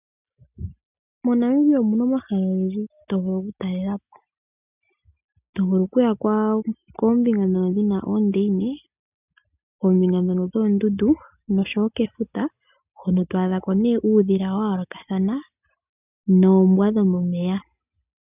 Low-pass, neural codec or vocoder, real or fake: 3.6 kHz; none; real